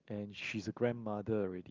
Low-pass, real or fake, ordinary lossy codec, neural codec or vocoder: 7.2 kHz; real; Opus, 32 kbps; none